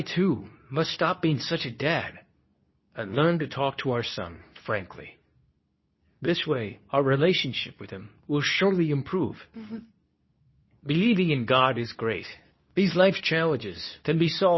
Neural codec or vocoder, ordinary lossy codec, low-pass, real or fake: codec, 24 kHz, 0.9 kbps, WavTokenizer, medium speech release version 2; MP3, 24 kbps; 7.2 kHz; fake